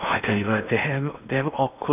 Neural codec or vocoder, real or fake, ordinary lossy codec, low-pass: codec, 16 kHz in and 24 kHz out, 0.8 kbps, FocalCodec, streaming, 65536 codes; fake; none; 3.6 kHz